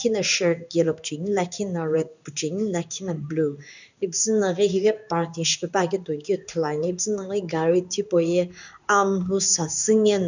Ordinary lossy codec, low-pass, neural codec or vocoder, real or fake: none; 7.2 kHz; codec, 16 kHz in and 24 kHz out, 1 kbps, XY-Tokenizer; fake